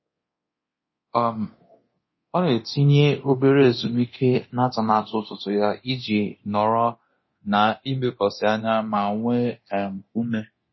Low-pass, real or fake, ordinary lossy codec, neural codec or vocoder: 7.2 kHz; fake; MP3, 24 kbps; codec, 24 kHz, 0.9 kbps, DualCodec